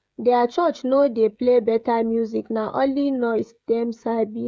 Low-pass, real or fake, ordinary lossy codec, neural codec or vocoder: none; fake; none; codec, 16 kHz, 16 kbps, FreqCodec, smaller model